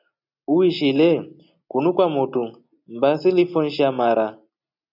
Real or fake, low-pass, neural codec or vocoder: real; 5.4 kHz; none